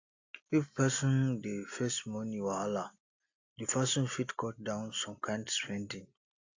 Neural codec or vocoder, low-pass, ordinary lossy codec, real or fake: none; 7.2 kHz; AAC, 48 kbps; real